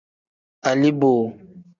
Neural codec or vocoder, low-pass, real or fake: none; 7.2 kHz; real